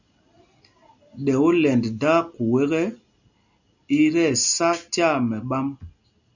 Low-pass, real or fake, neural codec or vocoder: 7.2 kHz; real; none